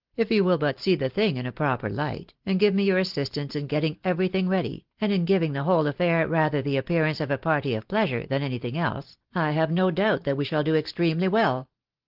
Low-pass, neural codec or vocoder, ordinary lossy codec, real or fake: 5.4 kHz; none; Opus, 16 kbps; real